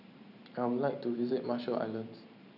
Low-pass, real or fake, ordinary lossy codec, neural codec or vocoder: 5.4 kHz; fake; none; vocoder, 44.1 kHz, 128 mel bands every 512 samples, BigVGAN v2